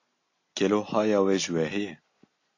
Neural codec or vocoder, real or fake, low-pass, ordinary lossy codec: none; real; 7.2 kHz; AAC, 48 kbps